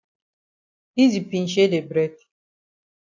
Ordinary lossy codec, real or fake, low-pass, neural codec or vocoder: AAC, 48 kbps; real; 7.2 kHz; none